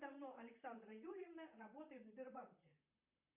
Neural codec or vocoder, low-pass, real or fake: vocoder, 44.1 kHz, 128 mel bands, Pupu-Vocoder; 3.6 kHz; fake